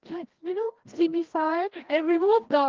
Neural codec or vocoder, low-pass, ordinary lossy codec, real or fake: codec, 16 kHz, 1 kbps, FreqCodec, larger model; 7.2 kHz; Opus, 16 kbps; fake